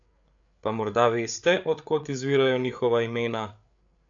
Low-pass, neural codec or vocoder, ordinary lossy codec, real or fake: 7.2 kHz; codec, 16 kHz, 8 kbps, FreqCodec, larger model; AAC, 64 kbps; fake